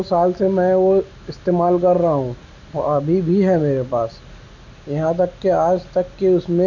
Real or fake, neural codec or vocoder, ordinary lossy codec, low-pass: real; none; none; 7.2 kHz